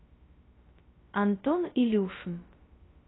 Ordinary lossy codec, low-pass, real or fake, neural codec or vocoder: AAC, 16 kbps; 7.2 kHz; fake; codec, 16 kHz, 0.2 kbps, FocalCodec